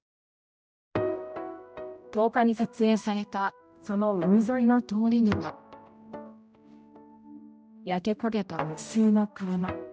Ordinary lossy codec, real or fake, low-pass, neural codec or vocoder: none; fake; none; codec, 16 kHz, 0.5 kbps, X-Codec, HuBERT features, trained on general audio